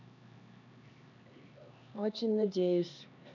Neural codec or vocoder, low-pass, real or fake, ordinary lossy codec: codec, 16 kHz, 2 kbps, X-Codec, HuBERT features, trained on LibriSpeech; 7.2 kHz; fake; none